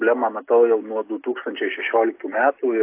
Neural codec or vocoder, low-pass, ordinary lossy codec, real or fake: none; 3.6 kHz; AAC, 24 kbps; real